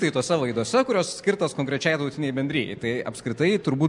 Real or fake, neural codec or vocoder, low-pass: real; none; 10.8 kHz